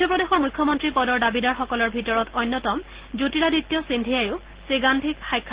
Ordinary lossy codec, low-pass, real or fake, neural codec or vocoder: Opus, 16 kbps; 3.6 kHz; real; none